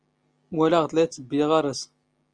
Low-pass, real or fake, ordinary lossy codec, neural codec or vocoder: 9.9 kHz; real; Opus, 32 kbps; none